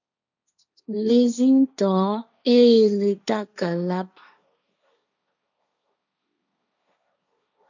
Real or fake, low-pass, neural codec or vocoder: fake; 7.2 kHz; codec, 16 kHz, 1.1 kbps, Voila-Tokenizer